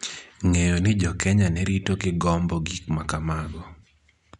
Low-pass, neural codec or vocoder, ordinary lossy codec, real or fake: 10.8 kHz; none; none; real